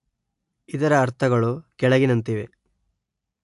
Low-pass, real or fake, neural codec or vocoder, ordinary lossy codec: 10.8 kHz; real; none; AAC, 64 kbps